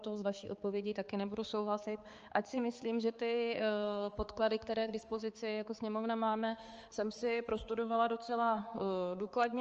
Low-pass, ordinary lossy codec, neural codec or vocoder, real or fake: 7.2 kHz; Opus, 24 kbps; codec, 16 kHz, 4 kbps, X-Codec, HuBERT features, trained on balanced general audio; fake